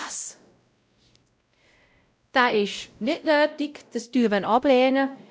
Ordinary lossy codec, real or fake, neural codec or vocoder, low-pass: none; fake; codec, 16 kHz, 0.5 kbps, X-Codec, WavLM features, trained on Multilingual LibriSpeech; none